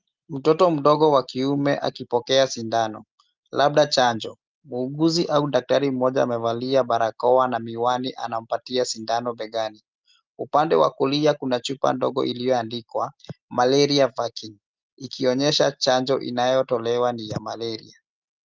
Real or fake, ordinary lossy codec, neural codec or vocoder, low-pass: real; Opus, 24 kbps; none; 7.2 kHz